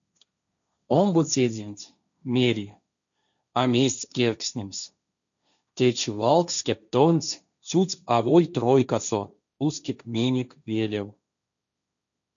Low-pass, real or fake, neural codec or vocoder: 7.2 kHz; fake; codec, 16 kHz, 1.1 kbps, Voila-Tokenizer